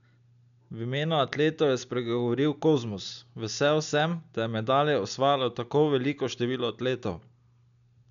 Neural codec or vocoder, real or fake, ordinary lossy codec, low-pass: none; real; none; 7.2 kHz